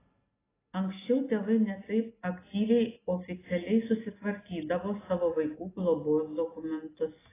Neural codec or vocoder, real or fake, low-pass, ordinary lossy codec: none; real; 3.6 kHz; AAC, 16 kbps